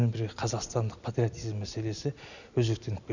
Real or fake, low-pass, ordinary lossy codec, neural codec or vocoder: real; 7.2 kHz; none; none